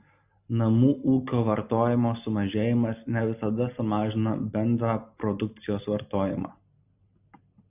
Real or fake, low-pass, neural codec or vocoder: real; 3.6 kHz; none